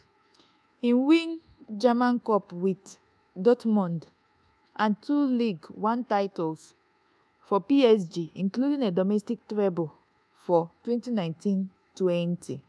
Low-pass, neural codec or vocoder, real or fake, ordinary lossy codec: none; codec, 24 kHz, 1.2 kbps, DualCodec; fake; none